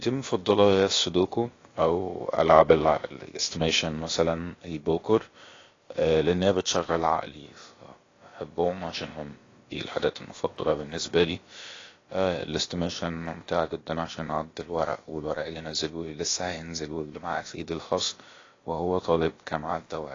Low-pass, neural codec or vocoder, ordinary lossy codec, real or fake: 7.2 kHz; codec, 16 kHz, about 1 kbps, DyCAST, with the encoder's durations; AAC, 32 kbps; fake